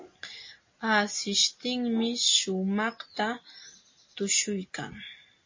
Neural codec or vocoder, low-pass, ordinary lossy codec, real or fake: none; 7.2 kHz; MP3, 32 kbps; real